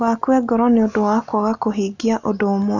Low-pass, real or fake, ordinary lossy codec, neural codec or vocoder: 7.2 kHz; real; none; none